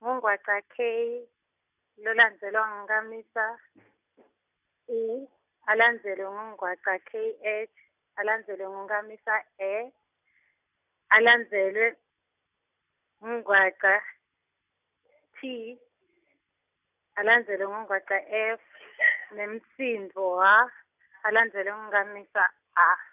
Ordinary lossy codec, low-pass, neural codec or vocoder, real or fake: none; 3.6 kHz; none; real